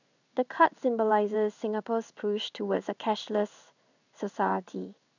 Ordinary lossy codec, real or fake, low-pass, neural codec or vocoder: none; fake; 7.2 kHz; codec, 16 kHz in and 24 kHz out, 1 kbps, XY-Tokenizer